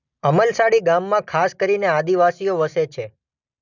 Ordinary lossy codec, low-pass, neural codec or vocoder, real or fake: none; 7.2 kHz; none; real